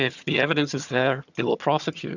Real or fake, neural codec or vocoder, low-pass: fake; vocoder, 22.05 kHz, 80 mel bands, HiFi-GAN; 7.2 kHz